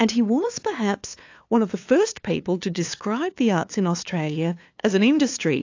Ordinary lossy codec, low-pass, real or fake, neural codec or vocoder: AAC, 48 kbps; 7.2 kHz; fake; codec, 16 kHz, 2 kbps, FunCodec, trained on LibriTTS, 25 frames a second